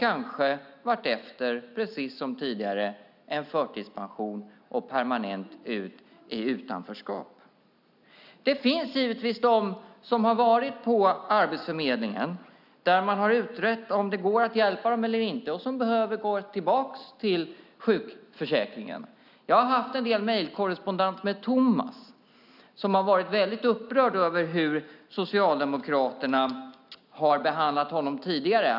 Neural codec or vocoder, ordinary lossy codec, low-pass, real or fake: none; none; 5.4 kHz; real